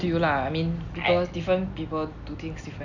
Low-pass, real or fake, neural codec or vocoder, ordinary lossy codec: 7.2 kHz; real; none; none